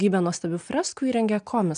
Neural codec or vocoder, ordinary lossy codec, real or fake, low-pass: none; MP3, 96 kbps; real; 9.9 kHz